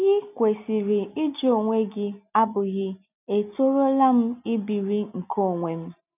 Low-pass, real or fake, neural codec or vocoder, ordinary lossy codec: 3.6 kHz; real; none; none